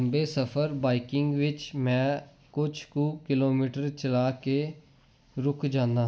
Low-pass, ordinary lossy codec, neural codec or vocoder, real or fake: none; none; none; real